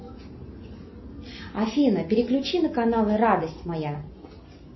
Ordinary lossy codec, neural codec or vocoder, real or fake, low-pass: MP3, 24 kbps; none; real; 7.2 kHz